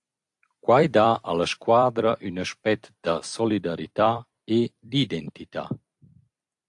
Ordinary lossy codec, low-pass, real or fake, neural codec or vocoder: AAC, 64 kbps; 10.8 kHz; fake; vocoder, 44.1 kHz, 128 mel bands every 256 samples, BigVGAN v2